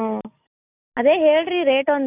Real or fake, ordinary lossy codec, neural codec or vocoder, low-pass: real; none; none; 3.6 kHz